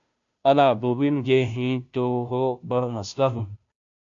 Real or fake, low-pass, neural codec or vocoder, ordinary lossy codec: fake; 7.2 kHz; codec, 16 kHz, 0.5 kbps, FunCodec, trained on Chinese and English, 25 frames a second; AAC, 64 kbps